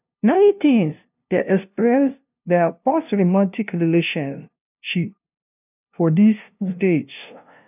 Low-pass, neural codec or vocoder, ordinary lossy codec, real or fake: 3.6 kHz; codec, 16 kHz, 0.5 kbps, FunCodec, trained on LibriTTS, 25 frames a second; none; fake